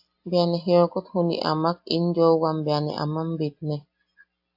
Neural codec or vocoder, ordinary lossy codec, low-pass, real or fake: none; AAC, 48 kbps; 5.4 kHz; real